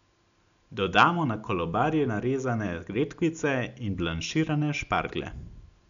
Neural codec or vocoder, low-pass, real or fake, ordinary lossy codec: none; 7.2 kHz; real; none